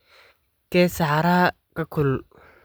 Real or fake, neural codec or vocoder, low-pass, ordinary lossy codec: real; none; none; none